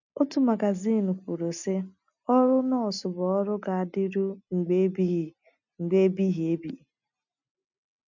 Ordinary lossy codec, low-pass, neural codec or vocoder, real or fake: none; 7.2 kHz; none; real